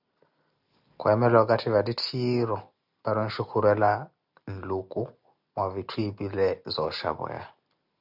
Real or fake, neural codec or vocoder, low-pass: real; none; 5.4 kHz